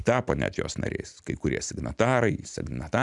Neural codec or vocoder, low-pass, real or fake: none; 10.8 kHz; real